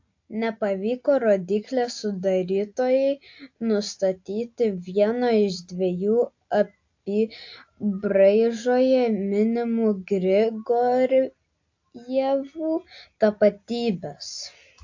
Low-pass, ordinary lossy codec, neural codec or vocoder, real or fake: 7.2 kHz; AAC, 48 kbps; none; real